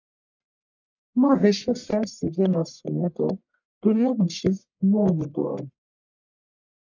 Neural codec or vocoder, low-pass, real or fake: codec, 44.1 kHz, 1.7 kbps, Pupu-Codec; 7.2 kHz; fake